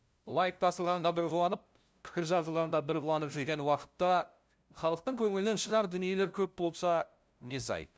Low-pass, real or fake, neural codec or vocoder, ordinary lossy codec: none; fake; codec, 16 kHz, 0.5 kbps, FunCodec, trained on LibriTTS, 25 frames a second; none